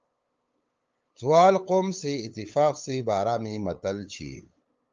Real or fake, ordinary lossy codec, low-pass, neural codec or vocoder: fake; Opus, 24 kbps; 7.2 kHz; codec, 16 kHz, 8 kbps, FunCodec, trained on LibriTTS, 25 frames a second